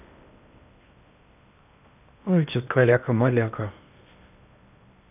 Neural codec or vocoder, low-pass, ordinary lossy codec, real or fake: codec, 16 kHz in and 24 kHz out, 0.8 kbps, FocalCodec, streaming, 65536 codes; 3.6 kHz; none; fake